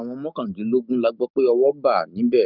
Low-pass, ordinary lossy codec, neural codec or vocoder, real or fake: 5.4 kHz; none; codec, 16 kHz, 6 kbps, DAC; fake